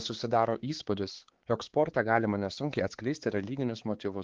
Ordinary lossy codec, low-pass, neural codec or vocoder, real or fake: Opus, 16 kbps; 7.2 kHz; codec, 16 kHz, 4 kbps, X-Codec, HuBERT features, trained on balanced general audio; fake